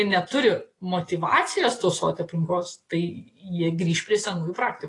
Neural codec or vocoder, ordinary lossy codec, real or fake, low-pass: none; AAC, 32 kbps; real; 10.8 kHz